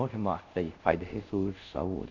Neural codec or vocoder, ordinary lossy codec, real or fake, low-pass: codec, 24 kHz, 0.5 kbps, DualCodec; none; fake; 7.2 kHz